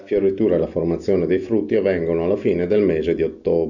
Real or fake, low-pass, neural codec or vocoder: real; 7.2 kHz; none